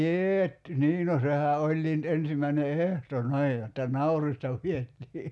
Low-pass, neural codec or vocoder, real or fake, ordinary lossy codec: none; none; real; none